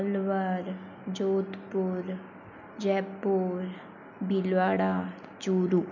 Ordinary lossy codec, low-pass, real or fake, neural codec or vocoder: none; 7.2 kHz; real; none